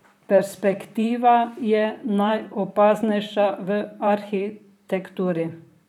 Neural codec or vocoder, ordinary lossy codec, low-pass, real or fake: vocoder, 44.1 kHz, 128 mel bands, Pupu-Vocoder; none; 19.8 kHz; fake